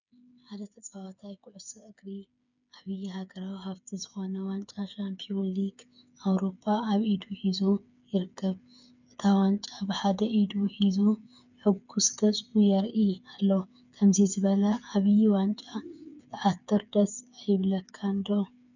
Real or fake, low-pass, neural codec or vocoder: fake; 7.2 kHz; codec, 16 kHz, 8 kbps, FreqCodec, smaller model